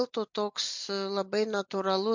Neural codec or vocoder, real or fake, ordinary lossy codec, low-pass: none; real; MP3, 64 kbps; 7.2 kHz